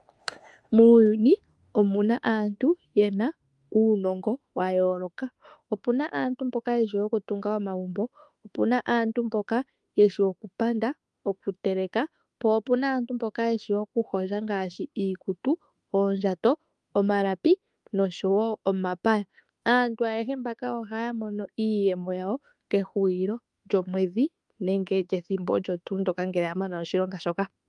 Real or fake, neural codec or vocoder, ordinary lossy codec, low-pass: fake; autoencoder, 48 kHz, 32 numbers a frame, DAC-VAE, trained on Japanese speech; Opus, 32 kbps; 10.8 kHz